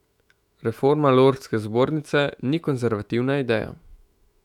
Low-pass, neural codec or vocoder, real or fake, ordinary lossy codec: 19.8 kHz; autoencoder, 48 kHz, 128 numbers a frame, DAC-VAE, trained on Japanese speech; fake; none